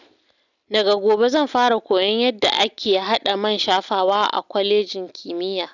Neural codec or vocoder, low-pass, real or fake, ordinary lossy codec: none; 7.2 kHz; real; none